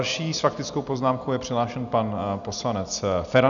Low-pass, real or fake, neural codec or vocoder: 7.2 kHz; real; none